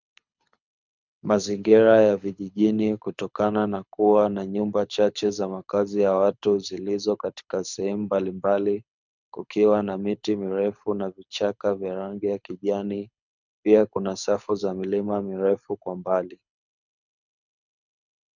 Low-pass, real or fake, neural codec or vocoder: 7.2 kHz; fake; codec, 24 kHz, 6 kbps, HILCodec